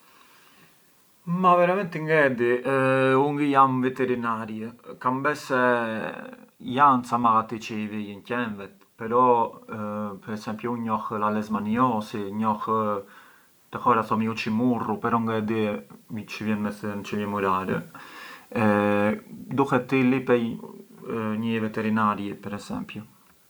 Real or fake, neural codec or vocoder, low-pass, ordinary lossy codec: real; none; none; none